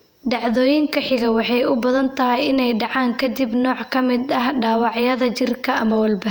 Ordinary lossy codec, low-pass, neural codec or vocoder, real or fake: none; 19.8 kHz; vocoder, 48 kHz, 128 mel bands, Vocos; fake